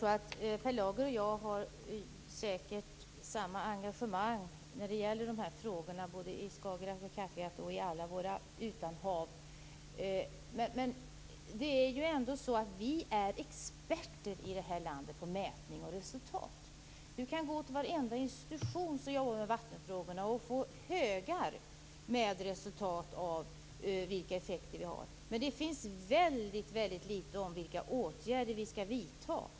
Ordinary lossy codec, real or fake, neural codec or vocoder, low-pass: none; real; none; none